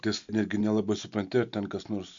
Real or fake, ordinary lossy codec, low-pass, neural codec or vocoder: real; MP3, 64 kbps; 7.2 kHz; none